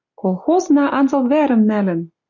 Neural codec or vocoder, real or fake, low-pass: none; real; 7.2 kHz